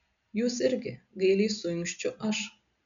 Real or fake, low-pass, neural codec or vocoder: real; 7.2 kHz; none